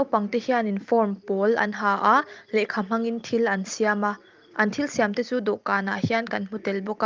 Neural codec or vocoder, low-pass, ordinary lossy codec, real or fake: none; 7.2 kHz; Opus, 32 kbps; real